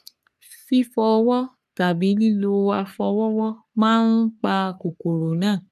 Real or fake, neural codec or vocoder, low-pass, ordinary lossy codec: fake; codec, 44.1 kHz, 3.4 kbps, Pupu-Codec; 14.4 kHz; none